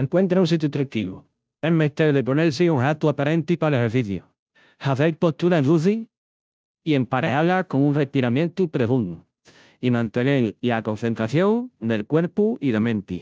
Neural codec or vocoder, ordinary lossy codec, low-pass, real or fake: codec, 16 kHz, 0.5 kbps, FunCodec, trained on Chinese and English, 25 frames a second; none; none; fake